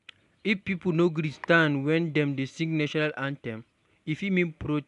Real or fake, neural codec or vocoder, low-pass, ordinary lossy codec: real; none; 10.8 kHz; none